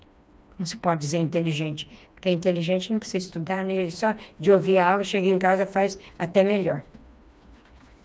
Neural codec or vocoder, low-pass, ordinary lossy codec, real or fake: codec, 16 kHz, 2 kbps, FreqCodec, smaller model; none; none; fake